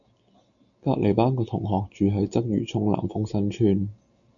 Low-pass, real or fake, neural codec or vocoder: 7.2 kHz; real; none